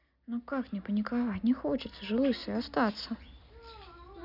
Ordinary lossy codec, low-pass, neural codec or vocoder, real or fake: none; 5.4 kHz; none; real